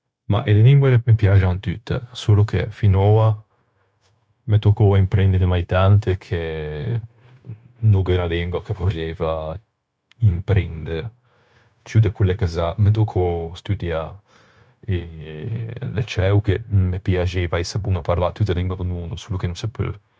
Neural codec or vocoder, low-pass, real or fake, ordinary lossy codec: codec, 16 kHz, 0.9 kbps, LongCat-Audio-Codec; none; fake; none